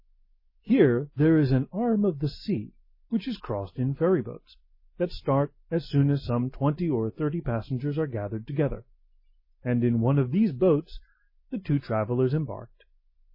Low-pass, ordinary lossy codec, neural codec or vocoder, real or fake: 5.4 kHz; MP3, 24 kbps; none; real